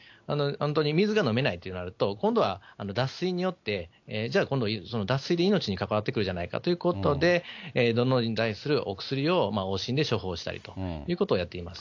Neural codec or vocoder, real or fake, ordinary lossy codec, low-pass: none; real; AAC, 48 kbps; 7.2 kHz